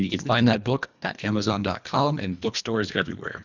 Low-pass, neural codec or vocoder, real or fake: 7.2 kHz; codec, 24 kHz, 1.5 kbps, HILCodec; fake